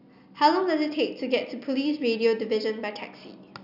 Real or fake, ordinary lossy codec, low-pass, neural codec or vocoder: real; none; 5.4 kHz; none